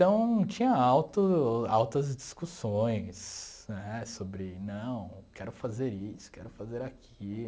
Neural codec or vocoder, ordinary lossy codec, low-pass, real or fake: none; none; none; real